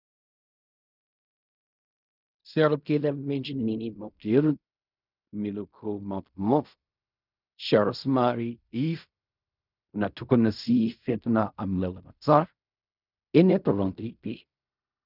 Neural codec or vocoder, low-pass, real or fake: codec, 16 kHz in and 24 kHz out, 0.4 kbps, LongCat-Audio-Codec, fine tuned four codebook decoder; 5.4 kHz; fake